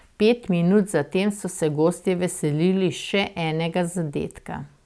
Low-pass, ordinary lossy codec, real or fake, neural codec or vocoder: none; none; real; none